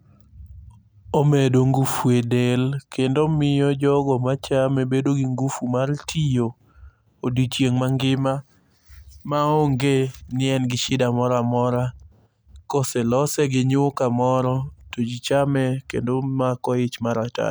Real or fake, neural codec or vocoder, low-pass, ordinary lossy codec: real; none; none; none